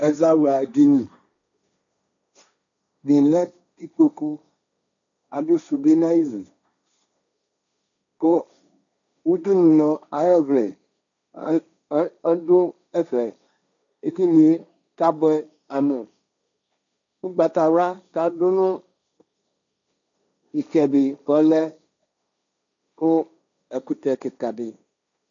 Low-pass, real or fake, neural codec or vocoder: 7.2 kHz; fake; codec, 16 kHz, 1.1 kbps, Voila-Tokenizer